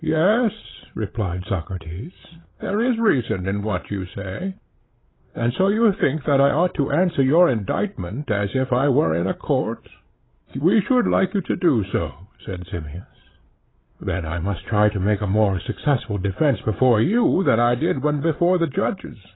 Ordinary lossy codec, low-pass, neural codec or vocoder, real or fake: AAC, 16 kbps; 7.2 kHz; codec, 16 kHz, 8 kbps, FreqCodec, larger model; fake